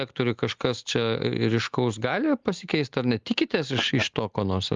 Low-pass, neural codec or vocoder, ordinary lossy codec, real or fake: 7.2 kHz; none; Opus, 32 kbps; real